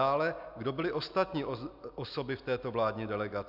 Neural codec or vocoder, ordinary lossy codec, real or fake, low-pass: none; AAC, 48 kbps; real; 5.4 kHz